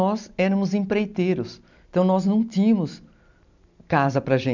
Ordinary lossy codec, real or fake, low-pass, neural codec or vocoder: none; real; 7.2 kHz; none